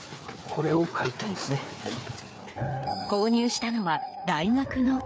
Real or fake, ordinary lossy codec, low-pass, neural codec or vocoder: fake; none; none; codec, 16 kHz, 4 kbps, FreqCodec, larger model